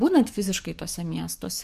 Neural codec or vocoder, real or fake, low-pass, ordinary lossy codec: codec, 44.1 kHz, 7.8 kbps, DAC; fake; 14.4 kHz; AAC, 96 kbps